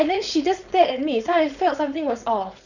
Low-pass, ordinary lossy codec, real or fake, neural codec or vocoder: 7.2 kHz; none; fake; codec, 16 kHz, 4.8 kbps, FACodec